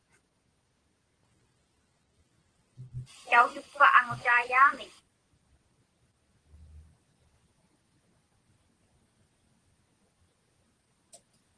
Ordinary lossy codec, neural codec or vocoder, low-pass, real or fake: Opus, 16 kbps; none; 9.9 kHz; real